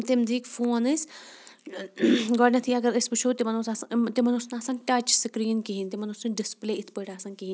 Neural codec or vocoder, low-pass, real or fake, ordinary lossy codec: none; none; real; none